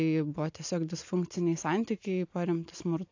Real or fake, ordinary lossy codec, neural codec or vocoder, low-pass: real; AAC, 48 kbps; none; 7.2 kHz